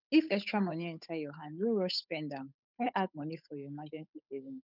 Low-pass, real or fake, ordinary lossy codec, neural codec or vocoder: 5.4 kHz; fake; none; codec, 16 kHz, 8 kbps, FunCodec, trained on Chinese and English, 25 frames a second